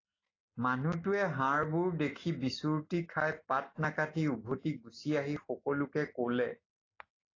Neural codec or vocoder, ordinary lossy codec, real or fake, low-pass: none; AAC, 32 kbps; real; 7.2 kHz